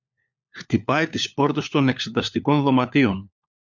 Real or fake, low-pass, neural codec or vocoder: fake; 7.2 kHz; codec, 16 kHz, 4 kbps, FunCodec, trained on LibriTTS, 50 frames a second